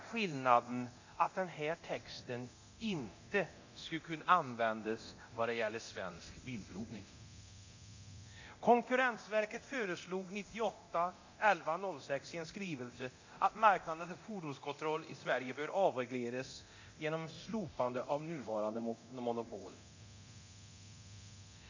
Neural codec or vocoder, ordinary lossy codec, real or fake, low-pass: codec, 24 kHz, 0.9 kbps, DualCodec; AAC, 48 kbps; fake; 7.2 kHz